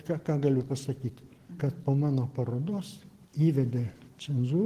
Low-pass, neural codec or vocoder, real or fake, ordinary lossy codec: 14.4 kHz; none; real; Opus, 16 kbps